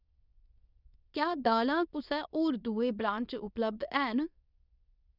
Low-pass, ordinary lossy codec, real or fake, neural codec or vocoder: 5.4 kHz; none; fake; codec, 24 kHz, 0.9 kbps, WavTokenizer, medium speech release version 2